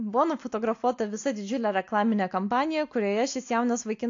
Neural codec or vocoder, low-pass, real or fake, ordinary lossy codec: none; 7.2 kHz; real; AAC, 48 kbps